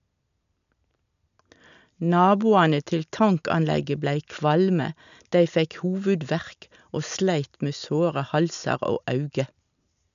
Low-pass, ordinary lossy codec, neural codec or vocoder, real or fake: 7.2 kHz; none; none; real